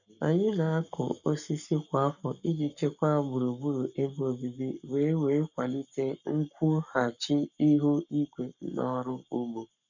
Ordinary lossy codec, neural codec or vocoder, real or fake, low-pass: none; codec, 44.1 kHz, 7.8 kbps, Pupu-Codec; fake; 7.2 kHz